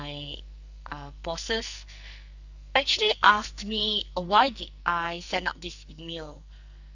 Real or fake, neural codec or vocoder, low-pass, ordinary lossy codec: fake; codec, 44.1 kHz, 2.6 kbps, SNAC; 7.2 kHz; none